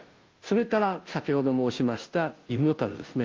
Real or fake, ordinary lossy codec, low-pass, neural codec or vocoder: fake; Opus, 32 kbps; 7.2 kHz; codec, 16 kHz, 0.5 kbps, FunCodec, trained on Chinese and English, 25 frames a second